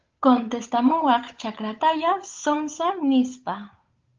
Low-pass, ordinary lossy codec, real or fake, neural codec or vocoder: 7.2 kHz; Opus, 24 kbps; fake; codec, 16 kHz, 8 kbps, FunCodec, trained on Chinese and English, 25 frames a second